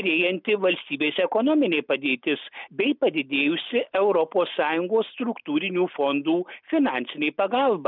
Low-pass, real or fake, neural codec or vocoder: 5.4 kHz; real; none